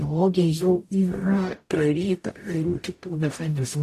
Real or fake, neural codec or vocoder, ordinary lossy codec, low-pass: fake; codec, 44.1 kHz, 0.9 kbps, DAC; AAC, 64 kbps; 14.4 kHz